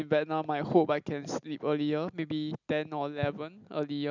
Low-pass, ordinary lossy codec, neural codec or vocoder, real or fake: 7.2 kHz; none; none; real